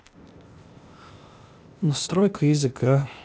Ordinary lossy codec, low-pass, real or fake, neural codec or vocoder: none; none; fake; codec, 16 kHz, 0.8 kbps, ZipCodec